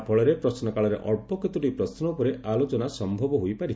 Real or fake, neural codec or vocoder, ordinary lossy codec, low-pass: real; none; none; none